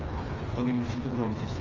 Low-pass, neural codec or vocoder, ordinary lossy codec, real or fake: 7.2 kHz; codec, 16 kHz, 4 kbps, FreqCodec, smaller model; Opus, 32 kbps; fake